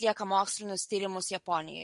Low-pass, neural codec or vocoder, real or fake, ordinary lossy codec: 10.8 kHz; none; real; MP3, 48 kbps